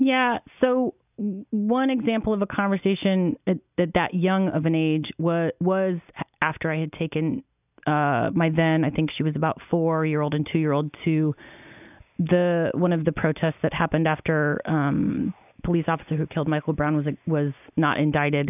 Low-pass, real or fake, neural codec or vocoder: 3.6 kHz; real; none